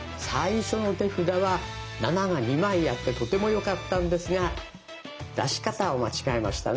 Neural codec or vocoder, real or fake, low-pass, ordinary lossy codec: none; real; none; none